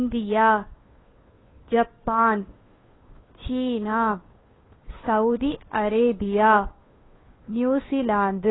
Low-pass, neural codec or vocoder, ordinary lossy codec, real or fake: 7.2 kHz; codec, 16 kHz, 2 kbps, FunCodec, trained on Chinese and English, 25 frames a second; AAC, 16 kbps; fake